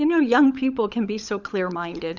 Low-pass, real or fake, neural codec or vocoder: 7.2 kHz; fake; codec, 16 kHz, 16 kbps, FunCodec, trained on LibriTTS, 50 frames a second